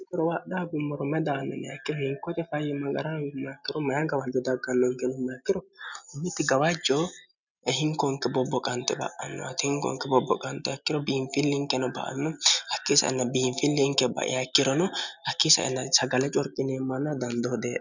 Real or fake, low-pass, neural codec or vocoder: real; 7.2 kHz; none